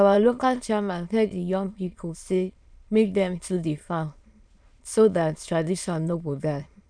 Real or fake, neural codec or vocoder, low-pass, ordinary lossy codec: fake; autoencoder, 22.05 kHz, a latent of 192 numbers a frame, VITS, trained on many speakers; 9.9 kHz; none